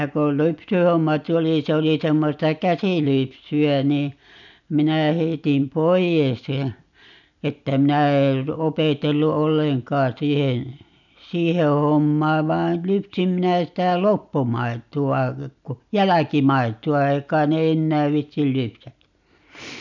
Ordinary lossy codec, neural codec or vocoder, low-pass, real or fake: none; none; 7.2 kHz; real